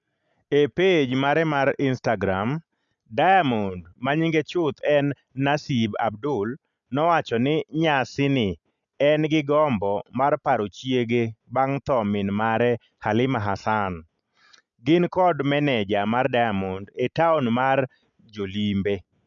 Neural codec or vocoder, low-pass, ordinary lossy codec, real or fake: none; 7.2 kHz; none; real